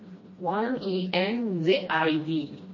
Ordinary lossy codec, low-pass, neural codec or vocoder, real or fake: MP3, 32 kbps; 7.2 kHz; codec, 16 kHz, 1 kbps, FreqCodec, smaller model; fake